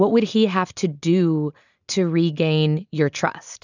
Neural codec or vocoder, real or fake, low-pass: none; real; 7.2 kHz